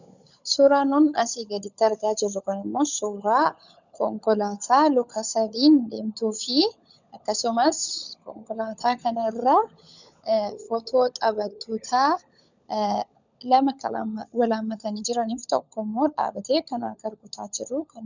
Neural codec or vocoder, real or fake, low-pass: codec, 16 kHz, 16 kbps, FunCodec, trained on LibriTTS, 50 frames a second; fake; 7.2 kHz